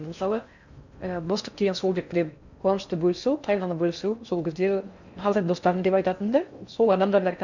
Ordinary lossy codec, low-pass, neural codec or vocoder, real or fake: none; 7.2 kHz; codec, 16 kHz in and 24 kHz out, 0.6 kbps, FocalCodec, streaming, 4096 codes; fake